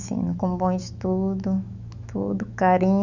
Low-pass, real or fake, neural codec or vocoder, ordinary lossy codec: 7.2 kHz; fake; autoencoder, 48 kHz, 128 numbers a frame, DAC-VAE, trained on Japanese speech; none